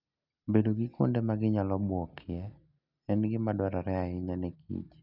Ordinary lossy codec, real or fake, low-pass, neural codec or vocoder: none; real; 5.4 kHz; none